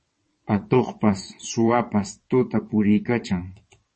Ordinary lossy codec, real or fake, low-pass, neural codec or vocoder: MP3, 32 kbps; fake; 9.9 kHz; vocoder, 22.05 kHz, 80 mel bands, WaveNeXt